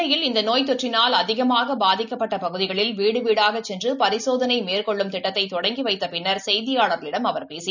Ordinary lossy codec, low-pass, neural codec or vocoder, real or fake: none; 7.2 kHz; none; real